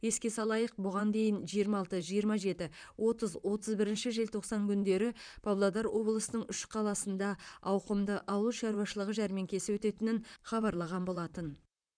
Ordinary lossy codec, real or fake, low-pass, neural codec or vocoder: none; fake; 9.9 kHz; vocoder, 44.1 kHz, 128 mel bands, Pupu-Vocoder